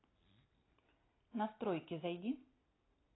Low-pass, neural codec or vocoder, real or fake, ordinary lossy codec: 7.2 kHz; none; real; AAC, 16 kbps